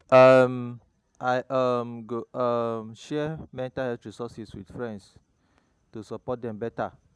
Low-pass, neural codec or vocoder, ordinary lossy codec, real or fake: none; none; none; real